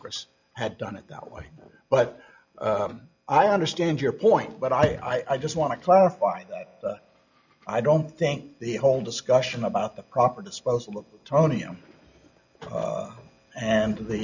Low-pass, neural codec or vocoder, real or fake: 7.2 kHz; none; real